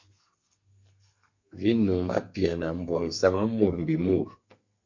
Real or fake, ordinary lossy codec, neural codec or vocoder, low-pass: fake; MP3, 48 kbps; codec, 32 kHz, 1.9 kbps, SNAC; 7.2 kHz